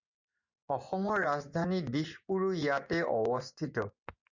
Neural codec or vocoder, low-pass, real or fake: vocoder, 24 kHz, 100 mel bands, Vocos; 7.2 kHz; fake